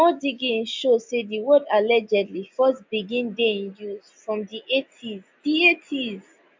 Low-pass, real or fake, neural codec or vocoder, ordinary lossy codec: 7.2 kHz; real; none; MP3, 64 kbps